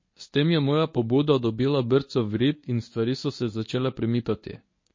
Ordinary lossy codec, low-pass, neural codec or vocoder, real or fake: MP3, 32 kbps; 7.2 kHz; codec, 24 kHz, 0.9 kbps, WavTokenizer, medium speech release version 1; fake